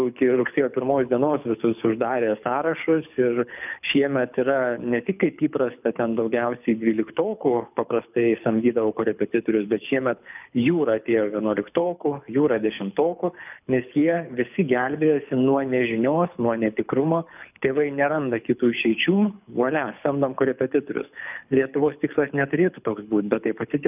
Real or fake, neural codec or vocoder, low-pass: fake; codec, 24 kHz, 6 kbps, HILCodec; 3.6 kHz